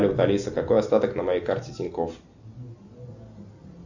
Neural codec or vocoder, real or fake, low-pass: none; real; 7.2 kHz